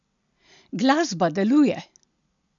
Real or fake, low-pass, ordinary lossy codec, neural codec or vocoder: real; 7.2 kHz; none; none